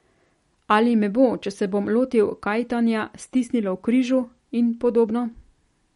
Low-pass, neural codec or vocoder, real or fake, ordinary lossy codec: 19.8 kHz; none; real; MP3, 48 kbps